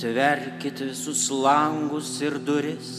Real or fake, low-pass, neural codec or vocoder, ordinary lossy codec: real; 14.4 kHz; none; MP3, 96 kbps